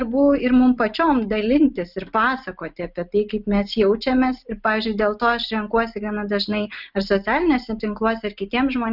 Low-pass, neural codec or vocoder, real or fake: 5.4 kHz; none; real